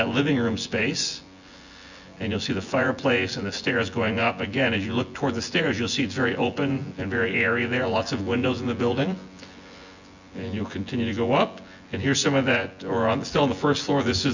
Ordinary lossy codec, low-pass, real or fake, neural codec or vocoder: Opus, 64 kbps; 7.2 kHz; fake; vocoder, 24 kHz, 100 mel bands, Vocos